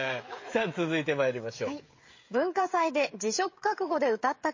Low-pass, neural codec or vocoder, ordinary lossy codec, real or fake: 7.2 kHz; codec, 16 kHz, 16 kbps, FreqCodec, smaller model; MP3, 32 kbps; fake